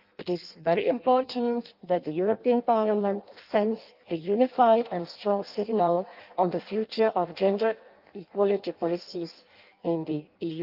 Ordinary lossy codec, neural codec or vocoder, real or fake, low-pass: Opus, 24 kbps; codec, 16 kHz in and 24 kHz out, 0.6 kbps, FireRedTTS-2 codec; fake; 5.4 kHz